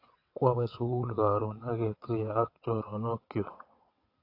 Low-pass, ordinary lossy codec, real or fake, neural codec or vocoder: 5.4 kHz; AAC, 32 kbps; fake; vocoder, 22.05 kHz, 80 mel bands, WaveNeXt